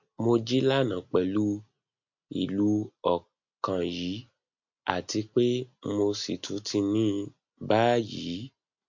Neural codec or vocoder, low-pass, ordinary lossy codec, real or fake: none; 7.2 kHz; MP3, 48 kbps; real